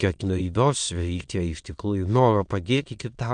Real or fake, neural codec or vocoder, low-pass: fake; autoencoder, 22.05 kHz, a latent of 192 numbers a frame, VITS, trained on many speakers; 9.9 kHz